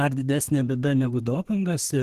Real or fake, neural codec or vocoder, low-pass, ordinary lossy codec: fake; codec, 32 kHz, 1.9 kbps, SNAC; 14.4 kHz; Opus, 16 kbps